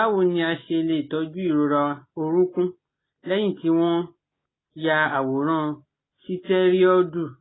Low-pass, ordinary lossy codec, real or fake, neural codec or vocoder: 7.2 kHz; AAC, 16 kbps; real; none